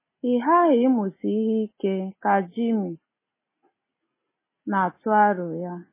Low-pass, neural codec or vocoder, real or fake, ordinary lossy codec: 3.6 kHz; none; real; MP3, 16 kbps